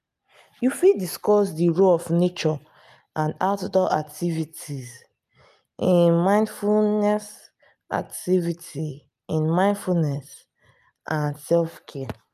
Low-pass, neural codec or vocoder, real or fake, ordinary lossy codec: 14.4 kHz; none; real; none